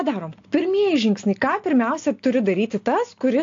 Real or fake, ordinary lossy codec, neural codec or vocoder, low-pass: real; AAC, 48 kbps; none; 7.2 kHz